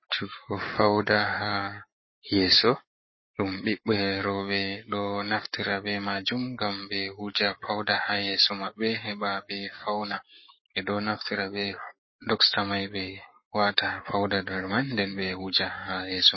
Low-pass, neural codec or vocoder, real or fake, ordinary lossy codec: 7.2 kHz; codec, 44.1 kHz, 7.8 kbps, Pupu-Codec; fake; MP3, 24 kbps